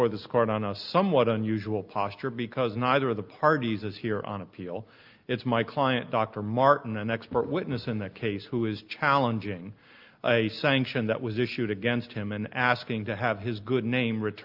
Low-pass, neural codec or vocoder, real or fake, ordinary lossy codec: 5.4 kHz; none; real; Opus, 24 kbps